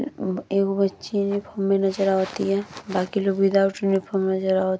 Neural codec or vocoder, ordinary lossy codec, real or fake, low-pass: none; none; real; none